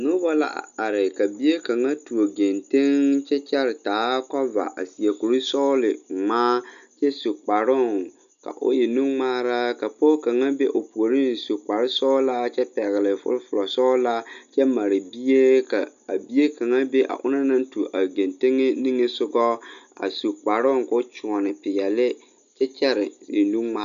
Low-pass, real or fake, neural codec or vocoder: 7.2 kHz; real; none